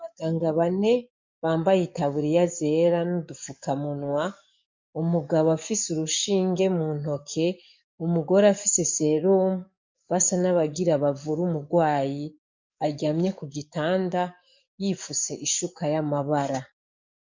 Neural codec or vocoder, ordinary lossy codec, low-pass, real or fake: codec, 44.1 kHz, 7.8 kbps, DAC; MP3, 48 kbps; 7.2 kHz; fake